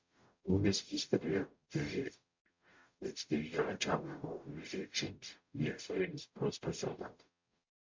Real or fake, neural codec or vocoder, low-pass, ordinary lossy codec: fake; codec, 44.1 kHz, 0.9 kbps, DAC; 7.2 kHz; MP3, 48 kbps